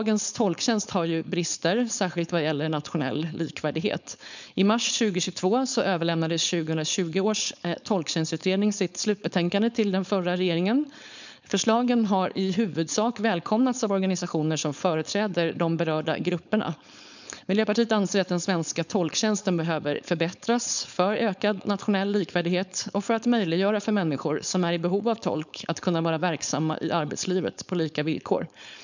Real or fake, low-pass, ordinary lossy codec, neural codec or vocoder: fake; 7.2 kHz; none; codec, 16 kHz, 4.8 kbps, FACodec